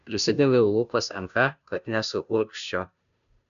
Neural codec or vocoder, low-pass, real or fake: codec, 16 kHz, 0.5 kbps, FunCodec, trained on Chinese and English, 25 frames a second; 7.2 kHz; fake